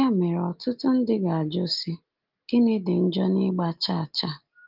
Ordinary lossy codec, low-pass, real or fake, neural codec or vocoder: Opus, 32 kbps; 5.4 kHz; real; none